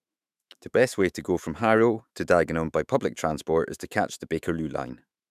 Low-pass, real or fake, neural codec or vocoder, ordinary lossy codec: 14.4 kHz; fake; autoencoder, 48 kHz, 128 numbers a frame, DAC-VAE, trained on Japanese speech; none